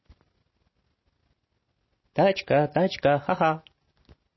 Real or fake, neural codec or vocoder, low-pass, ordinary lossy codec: real; none; 7.2 kHz; MP3, 24 kbps